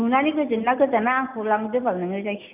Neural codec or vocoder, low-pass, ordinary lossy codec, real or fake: none; 3.6 kHz; none; real